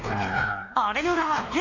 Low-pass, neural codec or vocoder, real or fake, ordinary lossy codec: 7.2 kHz; codec, 16 kHz, 2 kbps, X-Codec, WavLM features, trained on Multilingual LibriSpeech; fake; AAC, 48 kbps